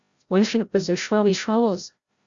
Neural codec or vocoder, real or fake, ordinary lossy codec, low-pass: codec, 16 kHz, 0.5 kbps, FreqCodec, larger model; fake; Opus, 64 kbps; 7.2 kHz